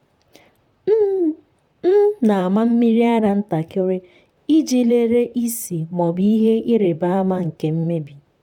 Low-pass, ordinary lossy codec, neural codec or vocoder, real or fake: 19.8 kHz; none; vocoder, 44.1 kHz, 128 mel bands, Pupu-Vocoder; fake